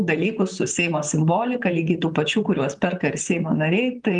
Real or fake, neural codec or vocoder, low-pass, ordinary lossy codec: fake; vocoder, 44.1 kHz, 128 mel bands, Pupu-Vocoder; 10.8 kHz; Opus, 32 kbps